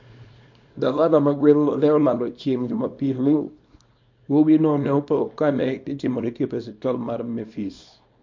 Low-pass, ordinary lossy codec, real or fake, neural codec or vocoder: 7.2 kHz; MP3, 48 kbps; fake; codec, 24 kHz, 0.9 kbps, WavTokenizer, small release